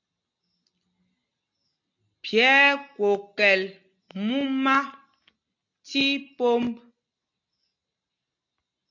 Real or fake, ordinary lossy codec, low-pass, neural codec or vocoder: real; AAC, 48 kbps; 7.2 kHz; none